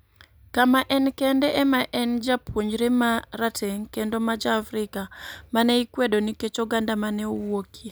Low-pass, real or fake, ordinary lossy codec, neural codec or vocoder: none; real; none; none